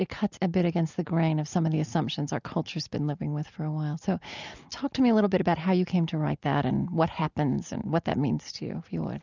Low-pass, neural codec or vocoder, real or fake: 7.2 kHz; none; real